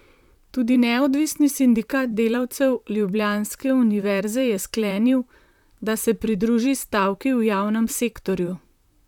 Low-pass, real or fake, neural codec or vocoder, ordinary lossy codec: 19.8 kHz; fake; vocoder, 44.1 kHz, 128 mel bands, Pupu-Vocoder; none